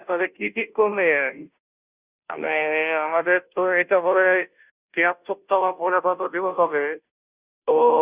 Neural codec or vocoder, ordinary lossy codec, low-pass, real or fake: codec, 16 kHz, 0.5 kbps, FunCodec, trained on Chinese and English, 25 frames a second; Opus, 64 kbps; 3.6 kHz; fake